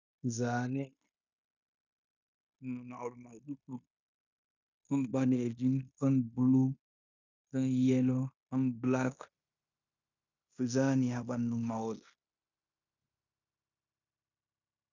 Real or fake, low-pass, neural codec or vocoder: fake; 7.2 kHz; codec, 16 kHz in and 24 kHz out, 0.9 kbps, LongCat-Audio-Codec, fine tuned four codebook decoder